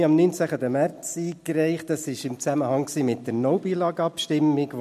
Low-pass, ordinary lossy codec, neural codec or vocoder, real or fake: 14.4 kHz; MP3, 64 kbps; none; real